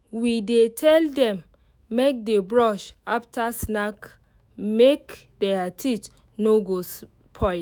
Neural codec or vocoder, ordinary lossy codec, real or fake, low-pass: autoencoder, 48 kHz, 128 numbers a frame, DAC-VAE, trained on Japanese speech; none; fake; none